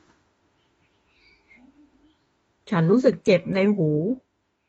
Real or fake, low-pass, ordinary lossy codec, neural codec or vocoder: fake; 19.8 kHz; AAC, 24 kbps; autoencoder, 48 kHz, 32 numbers a frame, DAC-VAE, trained on Japanese speech